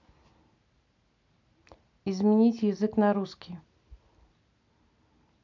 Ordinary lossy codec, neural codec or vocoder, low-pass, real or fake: MP3, 64 kbps; none; 7.2 kHz; real